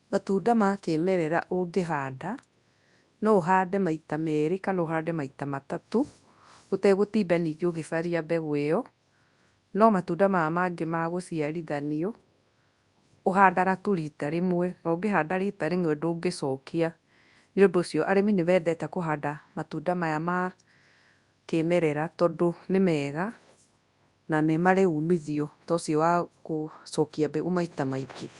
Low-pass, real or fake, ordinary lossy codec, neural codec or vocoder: 10.8 kHz; fake; Opus, 64 kbps; codec, 24 kHz, 0.9 kbps, WavTokenizer, large speech release